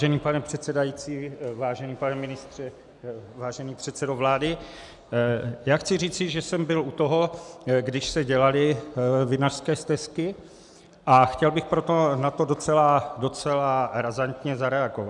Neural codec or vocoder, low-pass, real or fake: none; 10.8 kHz; real